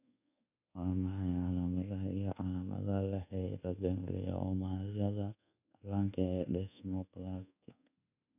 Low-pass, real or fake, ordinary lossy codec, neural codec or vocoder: 3.6 kHz; fake; none; codec, 24 kHz, 1.2 kbps, DualCodec